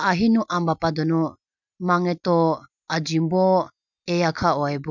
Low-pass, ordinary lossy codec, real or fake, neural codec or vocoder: 7.2 kHz; none; real; none